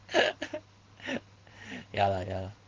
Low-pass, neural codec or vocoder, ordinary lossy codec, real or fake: 7.2 kHz; none; Opus, 16 kbps; real